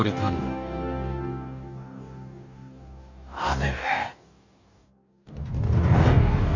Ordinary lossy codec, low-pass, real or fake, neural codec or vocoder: none; 7.2 kHz; fake; codec, 44.1 kHz, 2.6 kbps, DAC